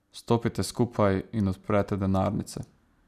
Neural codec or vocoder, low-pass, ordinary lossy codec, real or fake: none; 14.4 kHz; none; real